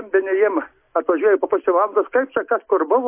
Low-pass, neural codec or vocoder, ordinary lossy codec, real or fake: 3.6 kHz; none; MP3, 24 kbps; real